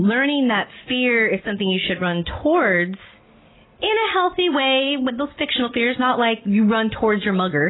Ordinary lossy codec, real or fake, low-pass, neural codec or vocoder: AAC, 16 kbps; real; 7.2 kHz; none